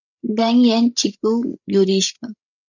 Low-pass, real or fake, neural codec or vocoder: 7.2 kHz; fake; codec, 16 kHz, 16 kbps, FreqCodec, larger model